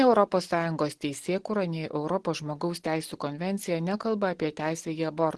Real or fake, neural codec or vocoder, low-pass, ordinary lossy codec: real; none; 10.8 kHz; Opus, 16 kbps